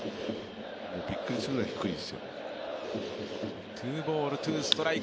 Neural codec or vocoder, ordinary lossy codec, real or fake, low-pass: none; none; real; none